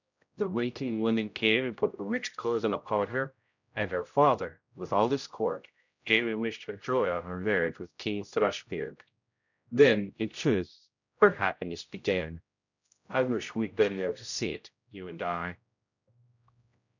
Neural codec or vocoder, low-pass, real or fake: codec, 16 kHz, 0.5 kbps, X-Codec, HuBERT features, trained on general audio; 7.2 kHz; fake